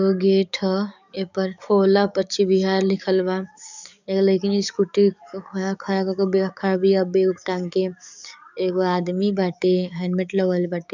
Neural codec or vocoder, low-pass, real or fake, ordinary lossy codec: codec, 44.1 kHz, 7.8 kbps, DAC; 7.2 kHz; fake; none